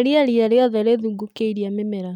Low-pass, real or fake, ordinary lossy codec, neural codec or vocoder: 19.8 kHz; real; none; none